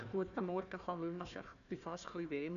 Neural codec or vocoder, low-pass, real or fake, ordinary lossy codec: codec, 16 kHz, 1 kbps, FunCodec, trained on Chinese and English, 50 frames a second; 7.2 kHz; fake; none